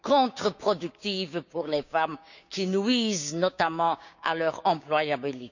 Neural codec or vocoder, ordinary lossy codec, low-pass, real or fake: codec, 16 kHz, 6 kbps, DAC; none; 7.2 kHz; fake